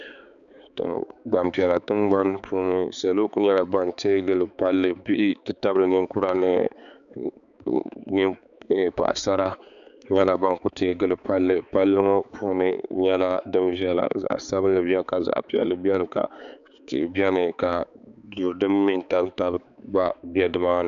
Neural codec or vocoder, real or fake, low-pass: codec, 16 kHz, 4 kbps, X-Codec, HuBERT features, trained on balanced general audio; fake; 7.2 kHz